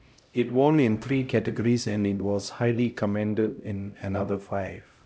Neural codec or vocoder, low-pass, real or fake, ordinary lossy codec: codec, 16 kHz, 0.5 kbps, X-Codec, HuBERT features, trained on LibriSpeech; none; fake; none